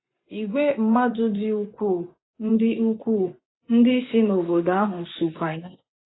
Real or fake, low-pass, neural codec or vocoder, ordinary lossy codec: fake; 7.2 kHz; vocoder, 24 kHz, 100 mel bands, Vocos; AAC, 16 kbps